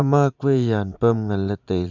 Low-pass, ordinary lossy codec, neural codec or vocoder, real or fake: 7.2 kHz; none; vocoder, 44.1 kHz, 80 mel bands, Vocos; fake